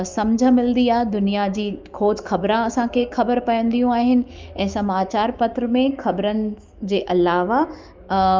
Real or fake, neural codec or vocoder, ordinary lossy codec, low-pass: fake; autoencoder, 48 kHz, 128 numbers a frame, DAC-VAE, trained on Japanese speech; Opus, 24 kbps; 7.2 kHz